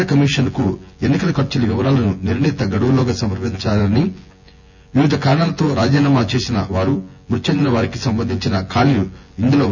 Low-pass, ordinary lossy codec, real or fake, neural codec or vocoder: 7.2 kHz; none; fake; vocoder, 24 kHz, 100 mel bands, Vocos